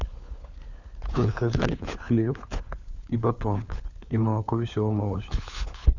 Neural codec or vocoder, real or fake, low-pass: codec, 16 kHz, 4 kbps, FunCodec, trained on LibriTTS, 50 frames a second; fake; 7.2 kHz